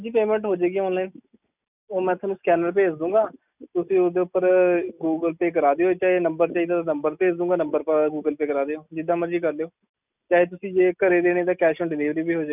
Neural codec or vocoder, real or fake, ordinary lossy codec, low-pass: none; real; none; 3.6 kHz